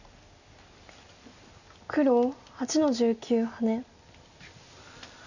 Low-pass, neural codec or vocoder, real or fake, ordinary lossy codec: 7.2 kHz; none; real; none